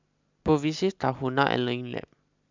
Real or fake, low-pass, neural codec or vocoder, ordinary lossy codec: real; 7.2 kHz; none; MP3, 64 kbps